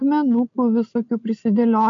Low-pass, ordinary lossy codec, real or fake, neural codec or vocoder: 7.2 kHz; MP3, 48 kbps; real; none